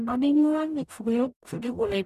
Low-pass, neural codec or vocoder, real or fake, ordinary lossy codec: 14.4 kHz; codec, 44.1 kHz, 0.9 kbps, DAC; fake; none